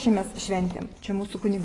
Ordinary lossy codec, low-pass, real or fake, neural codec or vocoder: AAC, 32 kbps; 10.8 kHz; real; none